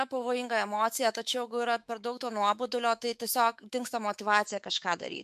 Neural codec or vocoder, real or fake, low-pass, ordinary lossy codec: autoencoder, 48 kHz, 128 numbers a frame, DAC-VAE, trained on Japanese speech; fake; 14.4 kHz; MP3, 96 kbps